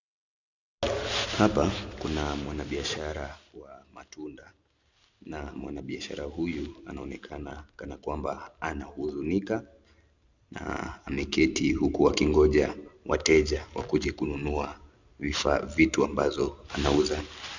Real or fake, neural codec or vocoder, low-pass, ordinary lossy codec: real; none; 7.2 kHz; Opus, 64 kbps